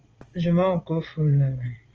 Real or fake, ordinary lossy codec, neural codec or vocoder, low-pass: fake; Opus, 24 kbps; vocoder, 22.05 kHz, 80 mel bands, Vocos; 7.2 kHz